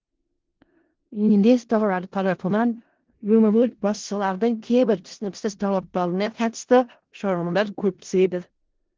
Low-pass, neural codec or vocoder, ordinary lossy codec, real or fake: 7.2 kHz; codec, 16 kHz in and 24 kHz out, 0.4 kbps, LongCat-Audio-Codec, four codebook decoder; Opus, 16 kbps; fake